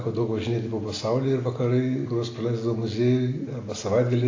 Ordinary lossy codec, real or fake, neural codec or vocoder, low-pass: AAC, 32 kbps; real; none; 7.2 kHz